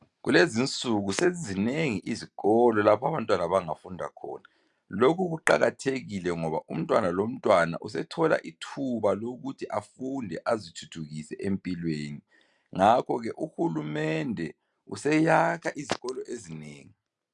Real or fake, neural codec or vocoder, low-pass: real; none; 10.8 kHz